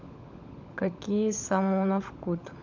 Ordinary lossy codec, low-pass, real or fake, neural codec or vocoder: none; 7.2 kHz; fake; codec, 16 kHz, 16 kbps, FunCodec, trained on LibriTTS, 50 frames a second